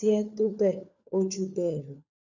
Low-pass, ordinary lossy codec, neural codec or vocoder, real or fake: 7.2 kHz; none; codec, 16 kHz, 4 kbps, FunCodec, trained on LibriTTS, 50 frames a second; fake